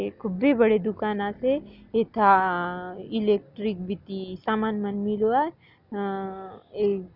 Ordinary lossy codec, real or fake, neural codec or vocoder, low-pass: none; real; none; 5.4 kHz